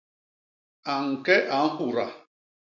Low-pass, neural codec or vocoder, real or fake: 7.2 kHz; none; real